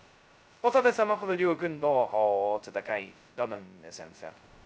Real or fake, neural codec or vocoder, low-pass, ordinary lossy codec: fake; codec, 16 kHz, 0.2 kbps, FocalCodec; none; none